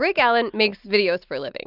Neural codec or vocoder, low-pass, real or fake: none; 5.4 kHz; real